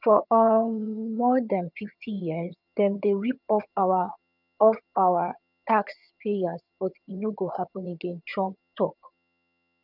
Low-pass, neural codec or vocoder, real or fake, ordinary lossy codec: 5.4 kHz; vocoder, 22.05 kHz, 80 mel bands, HiFi-GAN; fake; none